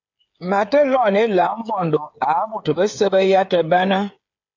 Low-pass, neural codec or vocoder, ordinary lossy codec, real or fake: 7.2 kHz; codec, 16 kHz, 8 kbps, FreqCodec, smaller model; AAC, 48 kbps; fake